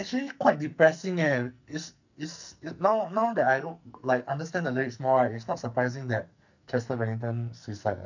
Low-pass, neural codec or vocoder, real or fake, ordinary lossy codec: 7.2 kHz; codec, 44.1 kHz, 2.6 kbps, SNAC; fake; none